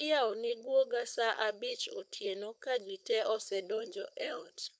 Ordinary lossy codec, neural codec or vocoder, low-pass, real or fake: none; codec, 16 kHz, 4.8 kbps, FACodec; none; fake